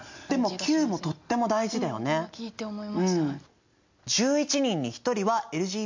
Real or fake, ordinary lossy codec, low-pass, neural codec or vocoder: real; MP3, 48 kbps; 7.2 kHz; none